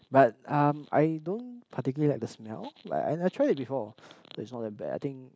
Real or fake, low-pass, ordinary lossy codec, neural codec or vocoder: real; none; none; none